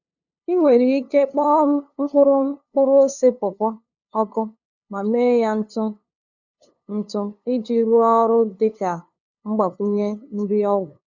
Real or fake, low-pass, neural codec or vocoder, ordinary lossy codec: fake; 7.2 kHz; codec, 16 kHz, 2 kbps, FunCodec, trained on LibriTTS, 25 frames a second; none